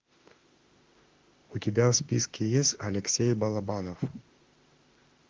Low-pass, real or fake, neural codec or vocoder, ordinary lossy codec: 7.2 kHz; fake; autoencoder, 48 kHz, 32 numbers a frame, DAC-VAE, trained on Japanese speech; Opus, 24 kbps